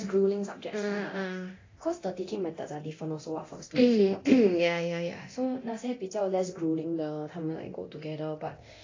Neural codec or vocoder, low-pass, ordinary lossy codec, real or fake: codec, 24 kHz, 0.9 kbps, DualCodec; 7.2 kHz; AAC, 32 kbps; fake